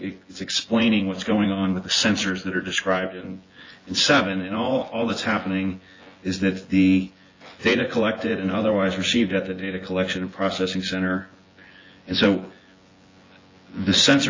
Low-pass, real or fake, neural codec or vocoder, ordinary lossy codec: 7.2 kHz; fake; vocoder, 24 kHz, 100 mel bands, Vocos; AAC, 32 kbps